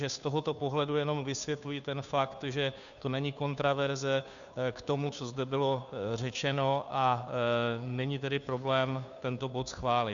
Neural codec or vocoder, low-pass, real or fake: codec, 16 kHz, 2 kbps, FunCodec, trained on Chinese and English, 25 frames a second; 7.2 kHz; fake